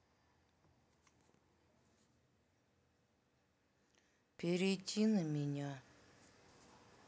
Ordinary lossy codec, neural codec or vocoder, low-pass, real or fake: none; none; none; real